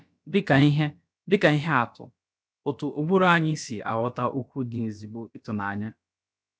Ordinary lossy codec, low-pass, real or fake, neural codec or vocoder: none; none; fake; codec, 16 kHz, about 1 kbps, DyCAST, with the encoder's durations